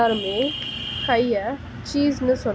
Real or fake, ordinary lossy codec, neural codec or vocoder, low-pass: real; none; none; none